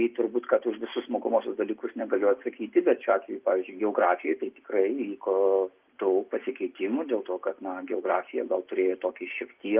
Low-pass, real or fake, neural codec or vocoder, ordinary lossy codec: 3.6 kHz; real; none; Opus, 24 kbps